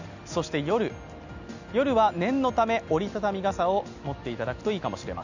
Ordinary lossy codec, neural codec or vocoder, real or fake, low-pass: none; none; real; 7.2 kHz